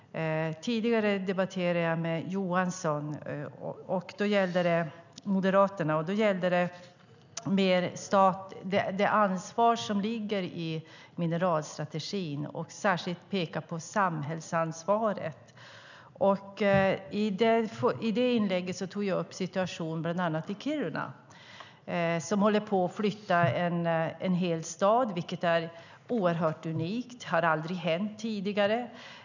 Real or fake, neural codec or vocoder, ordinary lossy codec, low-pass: real; none; none; 7.2 kHz